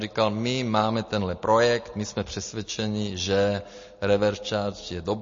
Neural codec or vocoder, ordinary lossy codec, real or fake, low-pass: none; MP3, 32 kbps; real; 7.2 kHz